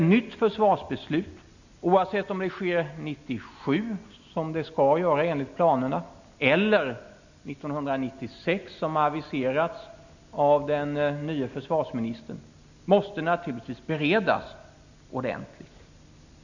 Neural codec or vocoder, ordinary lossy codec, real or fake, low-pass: none; none; real; 7.2 kHz